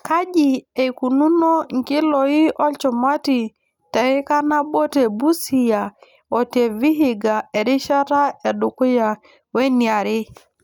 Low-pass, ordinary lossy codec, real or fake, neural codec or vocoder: 19.8 kHz; none; real; none